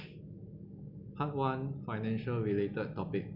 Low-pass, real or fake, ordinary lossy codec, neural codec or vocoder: 5.4 kHz; real; none; none